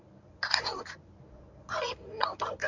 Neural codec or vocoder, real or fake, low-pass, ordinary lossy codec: codec, 24 kHz, 0.9 kbps, WavTokenizer, medium speech release version 1; fake; 7.2 kHz; none